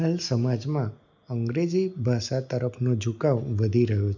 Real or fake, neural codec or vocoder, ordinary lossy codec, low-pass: real; none; none; 7.2 kHz